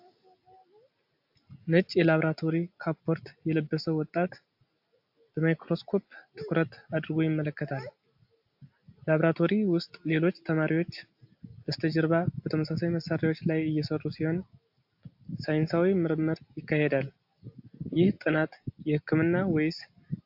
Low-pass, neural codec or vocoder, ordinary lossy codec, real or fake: 5.4 kHz; none; AAC, 48 kbps; real